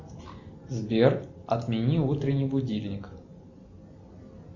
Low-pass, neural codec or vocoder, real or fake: 7.2 kHz; none; real